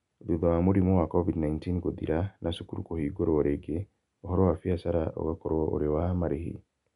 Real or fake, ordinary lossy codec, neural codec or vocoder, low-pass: real; none; none; 10.8 kHz